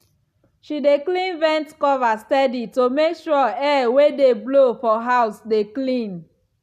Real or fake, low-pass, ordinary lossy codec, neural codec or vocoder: real; 14.4 kHz; none; none